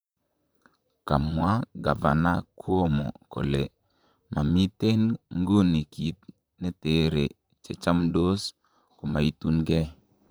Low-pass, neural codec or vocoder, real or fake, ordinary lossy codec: none; vocoder, 44.1 kHz, 128 mel bands, Pupu-Vocoder; fake; none